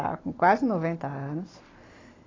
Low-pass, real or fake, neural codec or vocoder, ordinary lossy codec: 7.2 kHz; real; none; AAC, 32 kbps